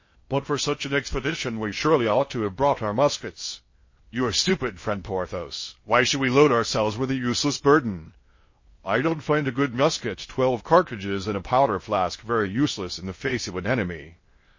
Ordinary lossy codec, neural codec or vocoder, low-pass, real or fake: MP3, 32 kbps; codec, 16 kHz in and 24 kHz out, 0.6 kbps, FocalCodec, streaming, 2048 codes; 7.2 kHz; fake